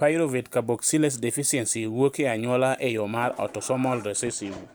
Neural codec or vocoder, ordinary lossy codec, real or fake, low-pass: none; none; real; none